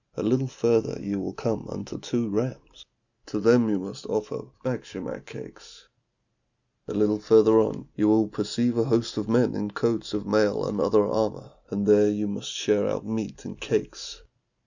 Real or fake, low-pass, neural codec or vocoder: real; 7.2 kHz; none